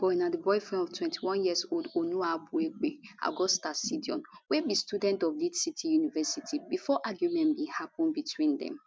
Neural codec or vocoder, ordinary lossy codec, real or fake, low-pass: none; none; real; none